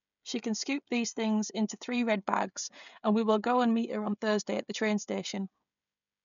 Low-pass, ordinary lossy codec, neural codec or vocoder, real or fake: 7.2 kHz; none; codec, 16 kHz, 16 kbps, FreqCodec, smaller model; fake